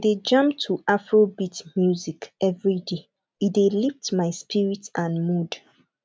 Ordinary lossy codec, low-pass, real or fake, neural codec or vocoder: none; none; real; none